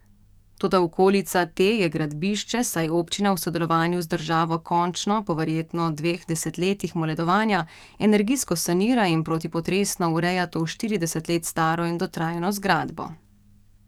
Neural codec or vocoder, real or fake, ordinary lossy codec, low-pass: codec, 44.1 kHz, 7.8 kbps, DAC; fake; none; 19.8 kHz